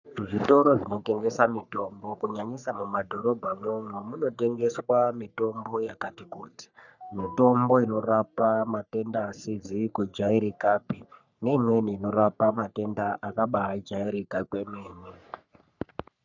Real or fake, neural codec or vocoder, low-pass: fake; codec, 44.1 kHz, 3.4 kbps, Pupu-Codec; 7.2 kHz